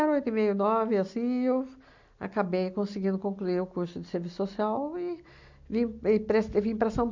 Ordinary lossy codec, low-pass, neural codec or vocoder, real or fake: MP3, 64 kbps; 7.2 kHz; none; real